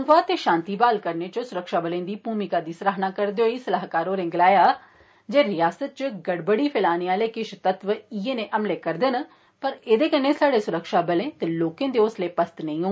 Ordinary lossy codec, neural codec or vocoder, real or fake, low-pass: none; none; real; none